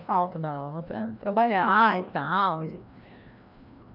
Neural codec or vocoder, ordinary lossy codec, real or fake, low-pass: codec, 16 kHz, 1 kbps, FreqCodec, larger model; none; fake; 5.4 kHz